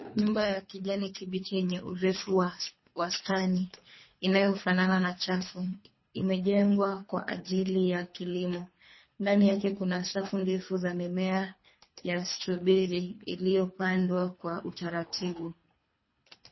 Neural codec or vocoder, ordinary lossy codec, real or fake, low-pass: codec, 24 kHz, 3 kbps, HILCodec; MP3, 24 kbps; fake; 7.2 kHz